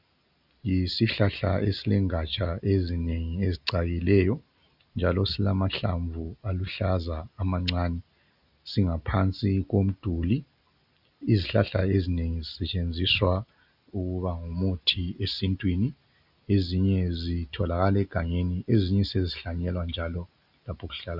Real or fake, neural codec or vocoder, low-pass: real; none; 5.4 kHz